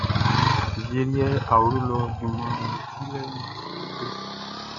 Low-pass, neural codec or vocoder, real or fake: 7.2 kHz; none; real